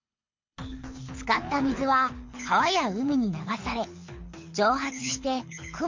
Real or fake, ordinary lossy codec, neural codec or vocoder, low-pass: fake; MP3, 48 kbps; codec, 24 kHz, 6 kbps, HILCodec; 7.2 kHz